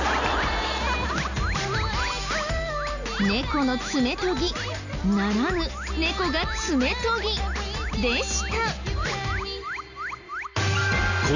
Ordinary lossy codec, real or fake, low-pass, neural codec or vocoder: none; real; 7.2 kHz; none